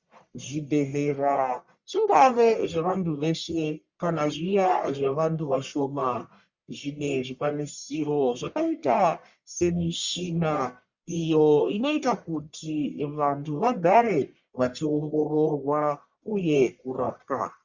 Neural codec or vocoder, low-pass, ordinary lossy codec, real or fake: codec, 44.1 kHz, 1.7 kbps, Pupu-Codec; 7.2 kHz; Opus, 64 kbps; fake